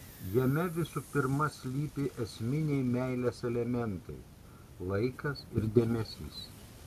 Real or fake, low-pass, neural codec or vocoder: real; 14.4 kHz; none